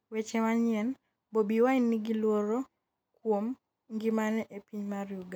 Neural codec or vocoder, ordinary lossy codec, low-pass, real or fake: none; none; 19.8 kHz; real